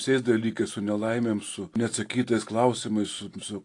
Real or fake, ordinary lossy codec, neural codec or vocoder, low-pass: real; AAC, 48 kbps; none; 10.8 kHz